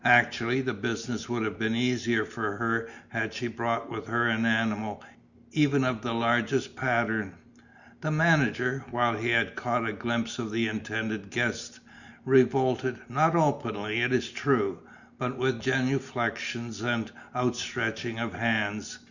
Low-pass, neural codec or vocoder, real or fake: 7.2 kHz; none; real